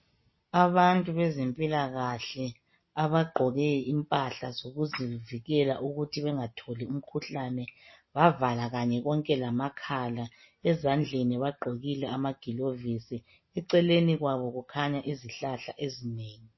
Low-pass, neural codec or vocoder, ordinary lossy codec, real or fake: 7.2 kHz; none; MP3, 24 kbps; real